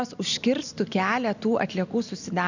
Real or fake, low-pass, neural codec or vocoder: real; 7.2 kHz; none